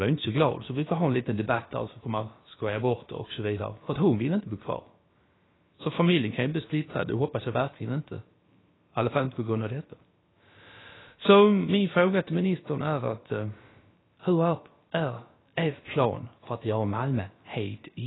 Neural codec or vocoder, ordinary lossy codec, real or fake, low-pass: codec, 16 kHz, about 1 kbps, DyCAST, with the encoder's durations; AAC, 16 kbps; fake; 7.2 kHz